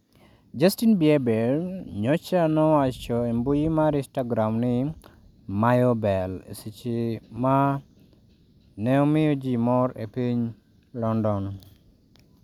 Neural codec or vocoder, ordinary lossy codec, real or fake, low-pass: none; none; real; 19.8 kHz